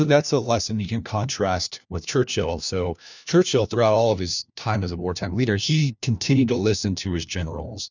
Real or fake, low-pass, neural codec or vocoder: fake; 7.2 kHz; codec, 16 kHz, 1 kbps, FunCodec, trained on LibriTTS, 50 frames a second